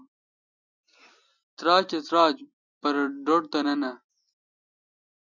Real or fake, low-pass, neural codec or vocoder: real; 7.2 kHz; none